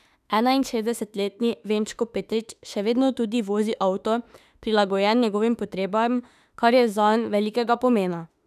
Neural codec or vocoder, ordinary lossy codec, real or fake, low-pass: autoencoder, 48 kHz, 32 numbers a frame, DAC-VAE, trained on Japanese speech; none; fake; 14.4 kHz